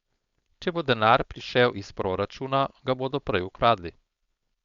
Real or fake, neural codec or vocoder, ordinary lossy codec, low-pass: fake; codec, 16 kHz, 4.8 kbps, FACodec; Opus, 64 kbps; 7.2 kHz